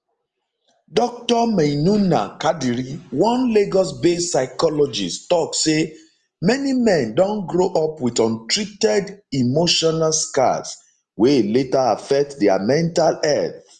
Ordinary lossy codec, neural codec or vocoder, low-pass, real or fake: Opus, 24 kbps; none; 10.8 kHz; real